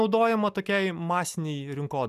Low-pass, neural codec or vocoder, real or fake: 14.4 kHz; none; real